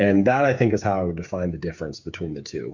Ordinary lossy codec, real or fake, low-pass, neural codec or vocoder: MP3, 48 kbps; fake; 7.2 kHz; codec, 16 kHz, 8 kbps, FreqCodec, smaller model